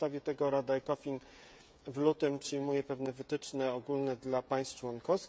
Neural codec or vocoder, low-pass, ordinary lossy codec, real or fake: codec, 16 kHz, 16 kbps, FreqCodec, smaller model; none; none; fake